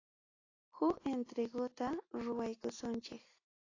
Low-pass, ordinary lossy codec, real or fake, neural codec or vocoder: 7.2 kHz; AAC, 32 kbps; real; none